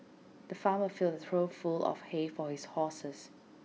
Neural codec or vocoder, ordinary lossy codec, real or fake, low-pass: none; none; real; none